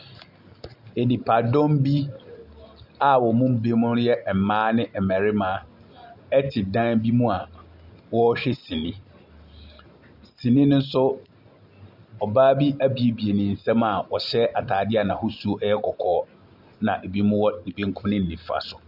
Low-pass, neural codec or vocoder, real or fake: 5.4 kHz; none; real